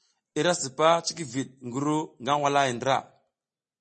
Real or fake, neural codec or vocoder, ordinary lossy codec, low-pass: real; none; MP3, 32 kbps; 10.8 kHz